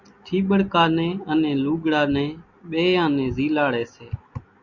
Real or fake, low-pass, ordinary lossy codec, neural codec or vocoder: real; 7.2 kHz; Opus, 64 kbps; none